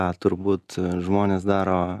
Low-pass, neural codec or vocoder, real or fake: 14.4 kHz; none; real